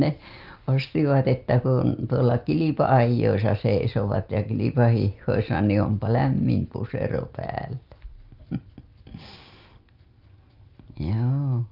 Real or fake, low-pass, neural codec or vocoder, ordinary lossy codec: real; 5.4 kHz; none; Opus, 24 kbps